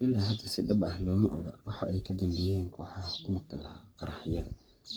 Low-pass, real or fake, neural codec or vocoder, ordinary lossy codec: none; fake; codec, 44.1 kHz, 3.4 kbps, Pupu-Codec; none